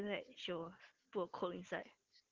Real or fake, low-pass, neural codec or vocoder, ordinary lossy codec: real; 7.2 kHz; none; Opus, 16 kbps